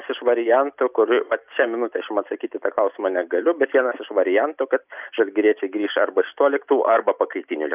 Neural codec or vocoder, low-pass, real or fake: none; 3.6 kHz; real